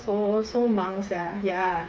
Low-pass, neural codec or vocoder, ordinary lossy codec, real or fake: none; codec, 16 kHz, 4 kbps, FreqCodec, smaller model; none; fake